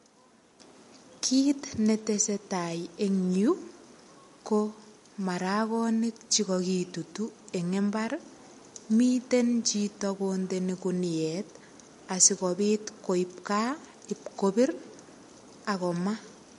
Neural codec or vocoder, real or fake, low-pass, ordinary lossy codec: none; real; 14.4 kHz; MP3, 48 kbps